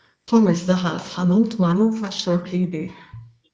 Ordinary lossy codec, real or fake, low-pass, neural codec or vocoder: Opus, 64 kbps; fake; 10.8 kHz; codec, 24 kHz, 0.9 kbps, WavTokenizer, medium music audio release